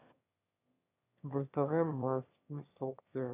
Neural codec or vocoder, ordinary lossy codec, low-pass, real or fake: autoencoder, 22.05 kHz, a latent of 192 numbers a frame, VITS, trained on one speaker; none; 3.6 kHz; fake